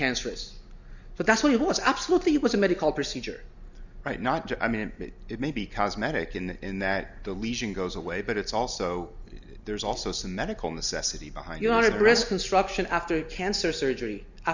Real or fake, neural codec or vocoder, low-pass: real; none; 7.2 kHz